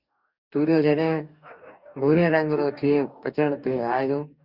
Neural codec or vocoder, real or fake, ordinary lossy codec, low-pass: codec, 44.1 kHz, 2.6 kbps, DAC; fake; Opus, 64 kbps; 5.4 kHz